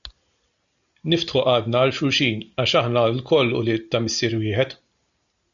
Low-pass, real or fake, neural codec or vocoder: 7.2 kHz; real; none